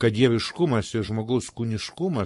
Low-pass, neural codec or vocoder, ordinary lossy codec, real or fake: 14.4 kHz; codec, 44.1 kHz, 7.8 kbps, Pupu-Codec; MP3, 48 kbps; fake